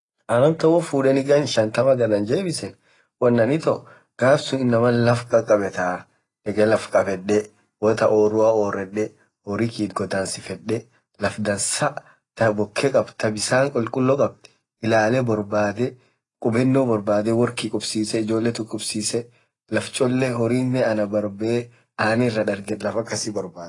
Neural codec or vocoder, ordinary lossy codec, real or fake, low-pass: none; AAC, 32 kbps; real; 10.8 kHz